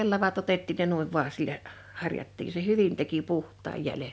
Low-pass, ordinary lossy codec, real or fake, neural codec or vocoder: none; none; real; none